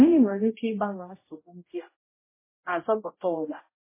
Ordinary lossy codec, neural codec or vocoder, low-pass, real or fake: MP3, 16 kbps; codec, 16 kHz, 0.5 kbps, X-Codec, HuBERT features, trained on general audio; 3.6 kHz; fake